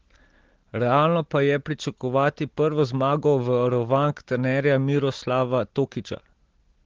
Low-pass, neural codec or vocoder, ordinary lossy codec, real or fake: 7.2 kHz; none; Opus, 16 kbps; real